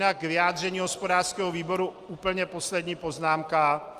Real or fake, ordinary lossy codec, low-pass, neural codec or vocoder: real; Opus, 32 kbps; 14.4 kHz; none